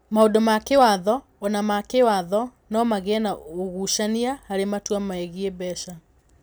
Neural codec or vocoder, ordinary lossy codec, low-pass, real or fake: none; none; none; real